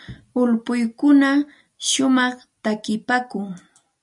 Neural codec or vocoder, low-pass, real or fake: none; 10.8 kHz; real